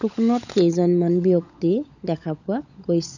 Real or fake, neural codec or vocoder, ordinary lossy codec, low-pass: fake; codec, 16 kHz, 16 kbps, FunCodec, trained on LibriTTS, 50 frames a second; none; 7.2 kHz